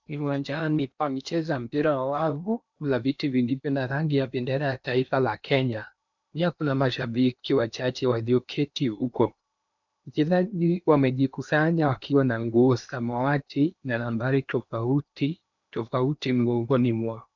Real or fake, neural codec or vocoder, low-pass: fake; codec, 16 kHz in and 24 kHz out, 0.8 kbps, FocalCodec, streaming, 65536 codes; 7.2 kHz